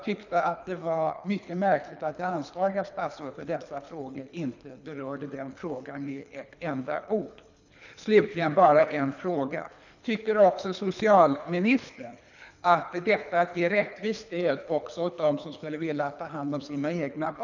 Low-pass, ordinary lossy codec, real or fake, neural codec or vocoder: 7.2 kHz; none; fake; codec, 24 kHz, 3 kbps, HILCodec